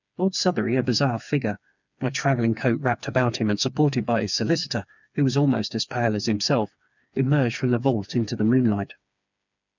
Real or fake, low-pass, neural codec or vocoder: fake; 7.2 kHz; codec, 16 kHz, 4 kbps, FreqCodec, smaller model